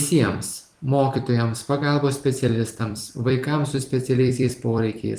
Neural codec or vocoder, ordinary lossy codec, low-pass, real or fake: vocoder, 44.1 kHz, 128 mel bands every 256 samples, BigVGAN v2; Opus, 24 kbps; 14.4 kHz; fake